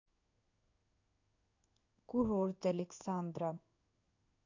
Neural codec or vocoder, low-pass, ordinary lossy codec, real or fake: codec, 16 kHz in and 24 kHz out, 1 kbps, XY-Tokenizer; 7.2 kHz; AAC, 48 kbps; fake